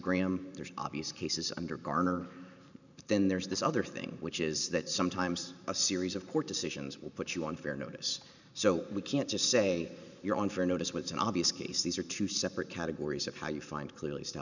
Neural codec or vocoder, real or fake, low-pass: vocoder, 44.1 kHz, 128 mel bands every 512 samples, BigVGAN v2; fake; 7.2 kHz